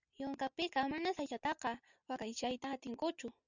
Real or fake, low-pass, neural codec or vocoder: real; 7.2 kHz; none